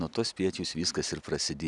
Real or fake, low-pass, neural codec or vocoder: real; 10.8 kHz; none